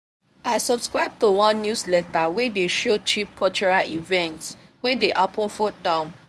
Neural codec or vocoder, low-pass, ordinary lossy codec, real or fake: codec, 24 kHz, 0.9 kbps, WavTokenizer, medium speech release version 1; none; none; fake